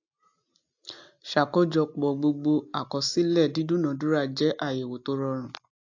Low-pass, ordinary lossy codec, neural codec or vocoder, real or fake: 7.2 kHz; AAC, 48 kbps; none; real